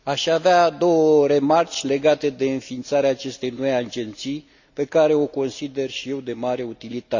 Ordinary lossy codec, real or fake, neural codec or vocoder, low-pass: none; real; none; 7.2 kHz